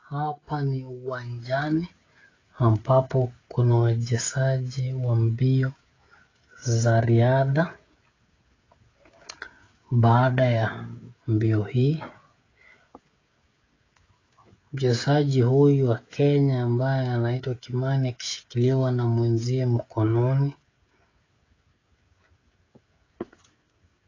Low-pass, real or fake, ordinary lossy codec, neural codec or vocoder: 7.2 kHz; fake; AAC, 32 kbps; codec, 16 kHz, 16 kbps, FreqCodec, smaller model